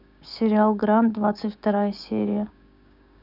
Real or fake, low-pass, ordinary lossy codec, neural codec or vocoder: fake; 5.4 kHz; none; codec, 16 kHz, 6 kbps, DAC